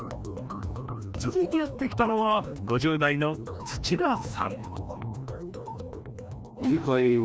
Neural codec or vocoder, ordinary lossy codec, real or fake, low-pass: codec, 16 kHz, 1 kbps, FreqCodec, larger model; none; fake; none